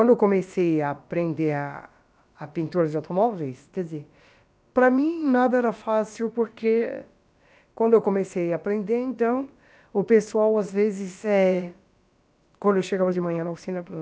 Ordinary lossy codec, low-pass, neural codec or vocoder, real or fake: none; none; codec, 16 kHz, about 1 kbps, DyCAST, with the encoder's durations; fake